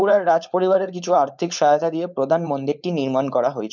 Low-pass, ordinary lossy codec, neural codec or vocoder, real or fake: 7.2 kHz; none; codec, 16 kHz, 4.8 kbps, FACodec; fake